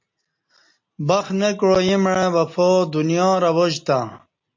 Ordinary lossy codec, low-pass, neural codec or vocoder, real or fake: MP3, 48 kbps; 7.2 kHz; none; real